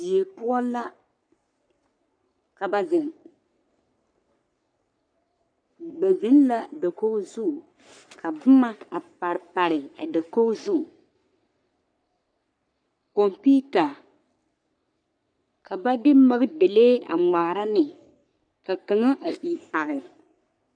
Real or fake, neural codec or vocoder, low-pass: fake; codec, 44.1 kHz, 3.4 kbps, Pupu-Codec; 9.9 kHz